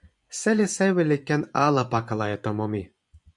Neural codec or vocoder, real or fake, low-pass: vocoder, 24 kHz, 100 mel bands, Vocos; fake; 10.8 kHz